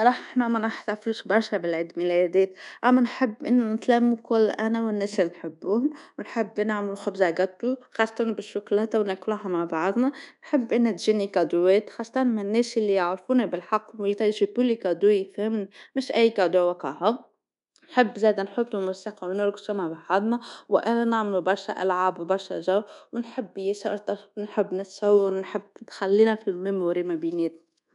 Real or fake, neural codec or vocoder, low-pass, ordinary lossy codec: fake; codec, 24 kHz, 1.2 kbps, DualCodec; 10.8 kHz; none